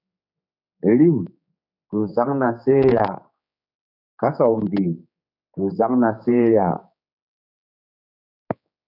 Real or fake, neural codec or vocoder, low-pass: fake; codec, 16 kHz, 4 kbps, X-Codec, HuBERT features, trained on general audio; 5.4 kHz